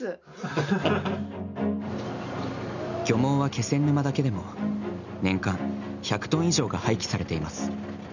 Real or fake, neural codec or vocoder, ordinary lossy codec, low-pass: real; none; none; 7.2 kHz